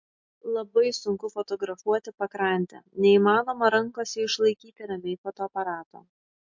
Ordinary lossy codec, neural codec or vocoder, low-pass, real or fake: MP3, 48 kbps; none; 7.2 kHz; real